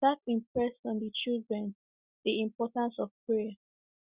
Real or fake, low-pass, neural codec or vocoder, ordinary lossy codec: real; 3.6 kHz; none; Opus, 64 kbps